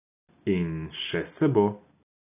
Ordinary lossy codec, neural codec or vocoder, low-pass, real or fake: none; none; 3.6 kHz; real